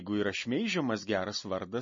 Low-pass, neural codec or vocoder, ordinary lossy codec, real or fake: 7.2 kHz; none; MP3, 32 kbps; real